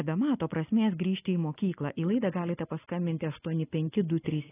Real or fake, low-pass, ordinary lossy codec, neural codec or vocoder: real; 3.6 kHz; AAC, 16 kbps; none